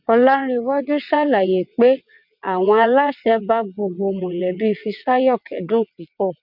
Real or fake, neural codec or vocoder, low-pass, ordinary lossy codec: fake; vocoder, 22.05 kHz, 80 mel bands, WaveNeXt; 5.4 kHz; MP3, 48 kbps